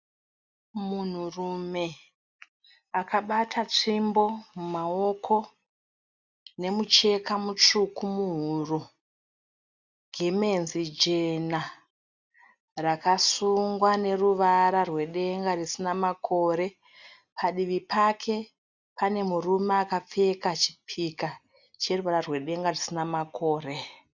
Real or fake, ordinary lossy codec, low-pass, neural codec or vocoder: real; Opus, 64 kbps; 7.2 kHz; none